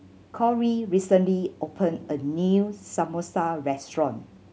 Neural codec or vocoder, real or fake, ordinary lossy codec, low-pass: none; real; none; none